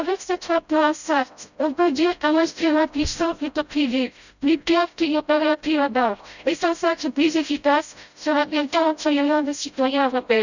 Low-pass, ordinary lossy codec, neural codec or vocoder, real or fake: 7.2 kHz; none; codec, 16 kHz, 0.5 kbps, FreqCodec, smaller model; fake